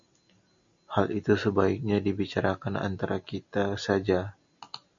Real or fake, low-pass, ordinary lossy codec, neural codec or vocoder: real; 7.2 kHz; MP3, 64 kbps; none